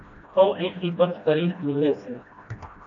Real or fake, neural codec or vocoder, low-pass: fake; codec, 16 kHz, 1 kbps, FreqCodec, smaller model; 7.2 kHz